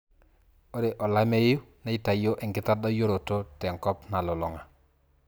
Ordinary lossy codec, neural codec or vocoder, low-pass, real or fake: none; none; none; real